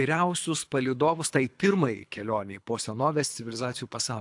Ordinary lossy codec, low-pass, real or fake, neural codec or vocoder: AAC, 64 kbps; 10.8 kHz; fake; codec, 24 kHz, 3 kbps, HILCodec